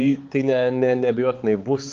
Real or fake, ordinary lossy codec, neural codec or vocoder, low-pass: fake; Opus, 32 kbps; codec, 16 kHz, 4 kbps, X-Codec, HuBERT features, trained on balanced general audio; 7.2 kHz